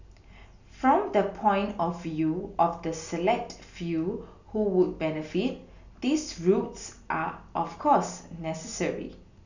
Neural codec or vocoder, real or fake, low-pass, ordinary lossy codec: none; real; 7.2 kHz; none